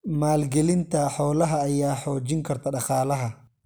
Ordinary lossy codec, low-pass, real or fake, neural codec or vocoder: none; none; real; none